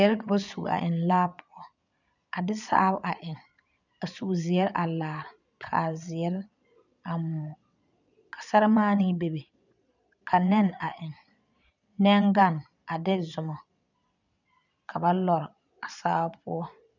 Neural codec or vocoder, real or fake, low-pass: vocoder, 22.05 kHz, 80 mel bands, Vocos; fake; 7.2 kHz